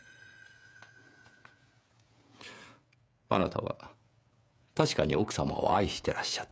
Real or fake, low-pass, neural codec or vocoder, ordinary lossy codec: fake; none; codec, 16 kHz, 16 kbps, FreqCodec, smaller model; none